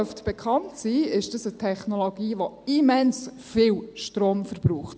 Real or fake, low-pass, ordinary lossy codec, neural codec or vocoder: real; none; none; none